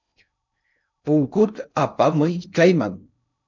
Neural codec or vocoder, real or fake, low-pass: codec, 16 kHz in and 24 kHz out, 0.6 kbps, FocalCodec, streaming, 2048 codes; fake; 7.2 kHz